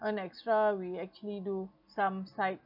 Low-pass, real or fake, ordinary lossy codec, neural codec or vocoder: 5.4 kHz; real; none; none